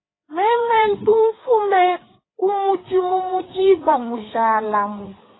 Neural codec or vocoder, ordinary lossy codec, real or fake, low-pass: codec, 16 kHz, 4 kbps, FreqCodec, larger model; AAC, 16 kbps; fake; 7.2 kHz